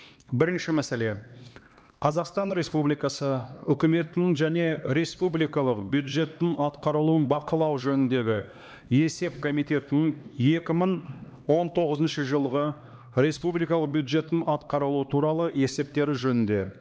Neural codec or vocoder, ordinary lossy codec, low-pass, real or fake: codec, 16 kHz, 2 kbps, X-Codec, HuBERT features, trained on LibriSpeech; none; none; fake